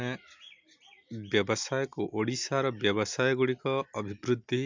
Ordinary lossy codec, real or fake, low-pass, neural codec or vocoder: MP3, 64 kbps; real; 7.2 kHz; none